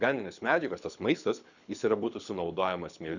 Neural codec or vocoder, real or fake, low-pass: codec, 24 kHz, 6 kbps, HILCodec; fake; 7.2 kHz